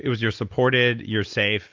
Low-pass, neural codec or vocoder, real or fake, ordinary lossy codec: 7.2 kHz; none; real; Opus, 32 kbps